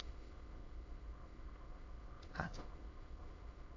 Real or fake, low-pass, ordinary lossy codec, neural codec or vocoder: fake; 7.2 kHz; AAC, 32 kbps; autoencoder, 22.05 kHz, a latent of 192 numbers a frame, VITS, trained on many speakers